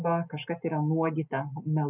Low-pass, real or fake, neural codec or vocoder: 3.6 kHz; real; none